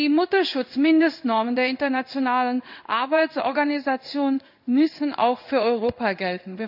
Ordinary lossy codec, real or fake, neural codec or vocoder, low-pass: none; fake; codec, 16 kHz in and 24 kHz out, 1 kbps, XY-Tokenizer; 5.4 kHz